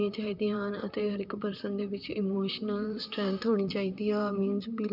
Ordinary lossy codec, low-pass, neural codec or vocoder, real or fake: none; 5.4 kHz; vocoder, 44.1 kHz, 128 mel bands, Pupu-Vocoder; fake